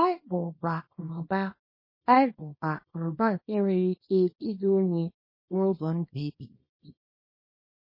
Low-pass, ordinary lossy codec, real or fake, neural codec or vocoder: 5.4 kHz; MP3, 24 kbps; fake; codec, 24 kHz, 0.9 kbps, WavTokenizer, small release